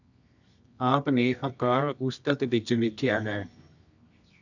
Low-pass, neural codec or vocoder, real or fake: 7.2 kHz; codec, 24 kHz, 0.9 kbps, WavTokenizer, medium music audio release; fake